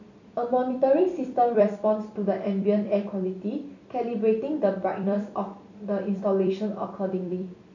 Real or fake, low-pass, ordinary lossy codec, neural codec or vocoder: fake; 7.2 kHz; none; vocoder, 44.1 kHz, 128 mel bands every 256 samples, BigVGAN v2